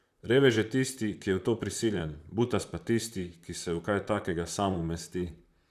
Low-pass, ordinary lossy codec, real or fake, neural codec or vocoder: 14.4 kHz; none; fake; vocoder, 44.1 kHz, 128 mel bands, Pupu-Vocoder